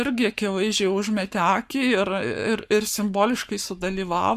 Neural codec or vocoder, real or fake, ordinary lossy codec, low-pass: codec, 44.1 kHz, 7.8 kbps, Pupu-Codec; fake; Opus, 64 kbps; 14.4 kHz